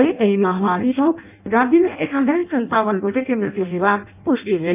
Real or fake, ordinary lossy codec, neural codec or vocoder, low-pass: fake; none; codec, 16 kHz in and 24 kHz out, 0.6 kbps, FireRedTTS-2 codec; 3.6 kHz